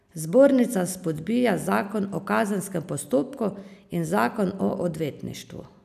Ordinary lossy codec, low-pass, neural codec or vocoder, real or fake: none; 14.4 kHz; none; real